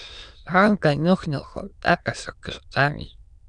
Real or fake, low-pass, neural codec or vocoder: fake; 9.9 kHz; autoencoder, 22.05 kHz, a latent of 192 numbers a frame, VITS, trained on many speakers